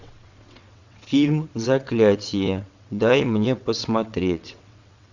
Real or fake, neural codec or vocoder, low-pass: fake; vocoder, 22.05 kHz, 80 mel bands, WaveNeXt; 7.2 kHz